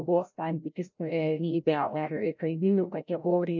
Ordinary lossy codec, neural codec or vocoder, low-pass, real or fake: MP3, 64 kbps; codec, 16 kHz, 0.5 kbps, FreqCodec, larger model; 7.2 kHz; fake